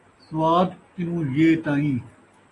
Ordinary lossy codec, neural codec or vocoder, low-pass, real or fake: AAC, 32 kbps; none; 10.8 kHz; real